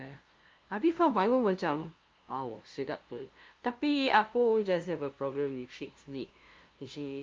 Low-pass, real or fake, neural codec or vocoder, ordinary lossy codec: 7.2 kHz; fake; codec, 16 kHz, 0.5 kbps, FunCodec, trained on LibriTTS, 25 frames a second; Opus, 24 kbps